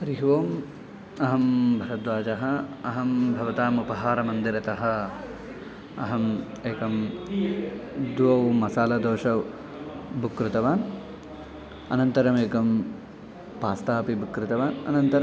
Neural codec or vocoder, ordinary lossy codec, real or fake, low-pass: none; none; real; none